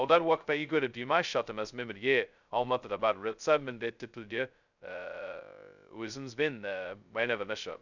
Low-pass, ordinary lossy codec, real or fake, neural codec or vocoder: 7.2 kHz; none; fake; codec, 16 kHz, 0.2 kbps, FocalCodec